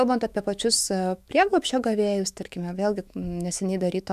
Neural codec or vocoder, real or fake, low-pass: autoencoder, 48 kHz, 128 numbers a frame, DAC-VAE, trained on Japanese speech; fake; 14.4 kHz